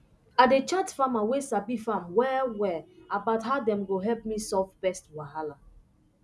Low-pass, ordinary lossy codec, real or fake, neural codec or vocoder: none; none; real; none